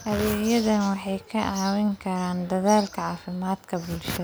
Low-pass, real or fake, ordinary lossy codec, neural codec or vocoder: none; real; none; none